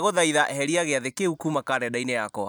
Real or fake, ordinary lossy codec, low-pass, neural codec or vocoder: real; none; none; none